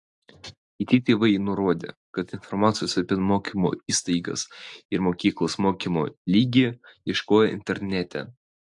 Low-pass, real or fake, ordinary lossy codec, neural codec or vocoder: 10.8 kHz; real; AAC, 64 kbps; none